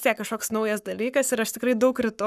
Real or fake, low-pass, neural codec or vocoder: fake; 14.4 kHz; codec, 44.1 kHz, 7.8 kbps, Pupu-Codec